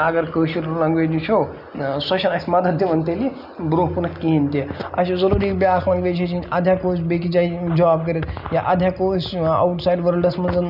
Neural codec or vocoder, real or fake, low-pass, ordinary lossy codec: none; real; 5.4 kHz; none